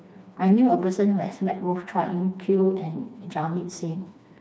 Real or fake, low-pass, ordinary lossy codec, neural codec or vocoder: fake; none; none; codec, 16 kHz, 2 kbps, FreqCodec, smaller model